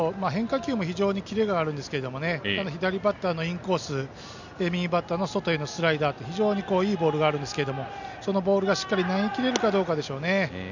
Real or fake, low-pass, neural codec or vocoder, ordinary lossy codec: real; 7.2 kHz; none; none